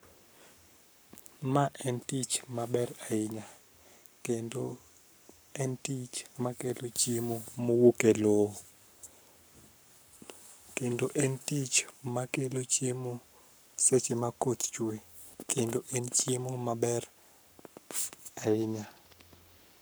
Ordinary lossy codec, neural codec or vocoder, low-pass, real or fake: none; codec, 44.1 kHz, 7.8 kbps, Pupu-Codec; none; fake